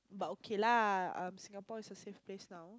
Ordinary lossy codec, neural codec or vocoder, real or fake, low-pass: none; none; real; none